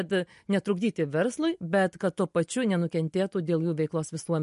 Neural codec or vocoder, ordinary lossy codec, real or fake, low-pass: none; MP3, 48 kbps; real; 10.8 kHz